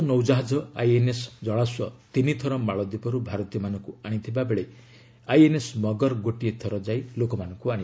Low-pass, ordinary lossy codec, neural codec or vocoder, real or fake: none; none; none; real